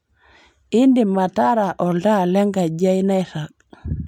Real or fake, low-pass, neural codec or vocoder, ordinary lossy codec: real; 19.8 kHz; none; MP3, 96 kbps